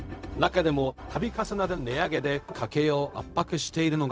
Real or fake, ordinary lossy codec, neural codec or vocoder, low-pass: fake; none; codec, 16 kHz, 0.4 kbps, LongCat-Audio-Codec; none